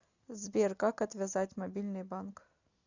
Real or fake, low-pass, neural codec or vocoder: real; 7.2 kHz; none